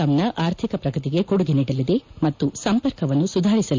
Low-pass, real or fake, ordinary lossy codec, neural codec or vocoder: 7.2 kHz; real; MP3, 64 kbps; none